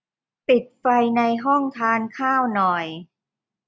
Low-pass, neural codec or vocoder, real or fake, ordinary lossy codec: none; none; real; none